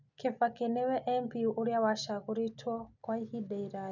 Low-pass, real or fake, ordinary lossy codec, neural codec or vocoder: 7.2 kHz; real; none; none